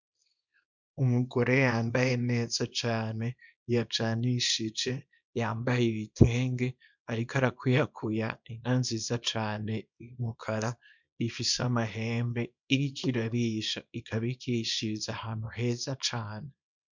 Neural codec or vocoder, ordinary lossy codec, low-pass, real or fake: codec, 24 kHz, 0.9 kbps, WavTokenizer, small release; MP3, 64 kbps; 7.2 kHz; fake